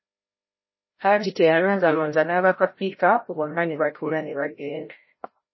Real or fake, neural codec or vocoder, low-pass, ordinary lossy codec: fake; codec, 16 kHz, 0.5 kbps, FreqCodec, larger model; 7.2 kHz; MP3, 24 kbps